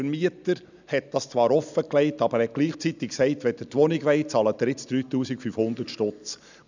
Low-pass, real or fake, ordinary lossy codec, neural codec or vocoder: 7.2 kHz; real; none; none